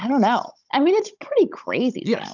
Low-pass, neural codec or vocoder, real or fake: 7.2 kHz; codec, 16 kHz, 16 kbps, FunCodec, trained on Chinese and English, 50 frames a second; fake